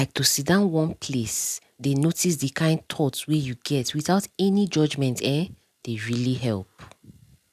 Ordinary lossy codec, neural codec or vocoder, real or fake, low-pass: none; none; real; 14.4 kHz